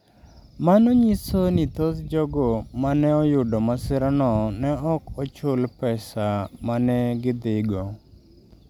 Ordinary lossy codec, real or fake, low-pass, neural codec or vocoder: none; real; 19.8 kHz; none